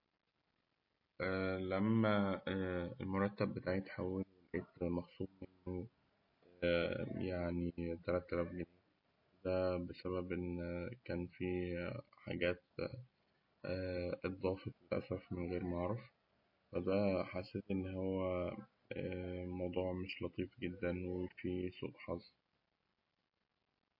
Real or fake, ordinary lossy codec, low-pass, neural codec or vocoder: real; MP3, 32 kbps; 5.4 kHz; none